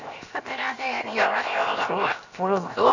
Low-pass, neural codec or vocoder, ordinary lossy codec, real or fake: 7.2 kHz; codec, 16 kHz, 0.7 kbps, FocalCodec; none; fake